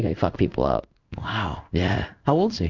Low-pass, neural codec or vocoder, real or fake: 7.2 kHz; codec, 16 kHz in and 24 kHz out, 1 kbps, XY-Tokenizer; fake